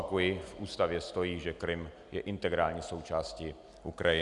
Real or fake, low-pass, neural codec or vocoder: real; 10.8 kHz; none